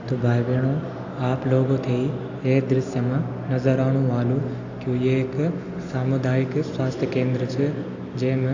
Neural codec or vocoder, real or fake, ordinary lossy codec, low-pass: none; real; AAC, 48 kbps; 7.2 kHz